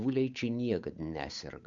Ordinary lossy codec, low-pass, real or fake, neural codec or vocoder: AAC, 64 kbps; 7.2 kHz; fake; codec, 16 kHz, 8 kbps, FunCodec, trained on Chinese and English, 25 frames a second